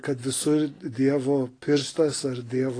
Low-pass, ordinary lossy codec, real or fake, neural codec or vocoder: 9.9 kHz; AAC, 32 kbps; real; none